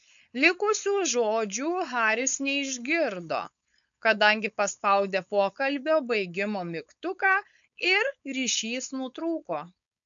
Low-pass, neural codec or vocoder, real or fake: 7.2 kHz; codec, 16 kHz, 4.8 kbps, FACodec; fake